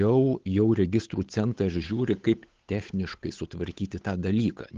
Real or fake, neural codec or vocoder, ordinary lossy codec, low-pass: fake; codec, 16 kHz, 8 kbps, FunCodec, trained on Chinese and English, 25 frames a second; Opus, 16 kbps; 7.2 kHz